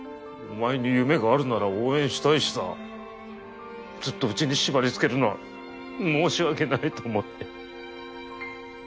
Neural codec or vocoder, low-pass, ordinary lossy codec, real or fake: none; none; none; real